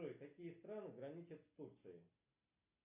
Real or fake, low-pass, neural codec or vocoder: real; 3.6 kHz; none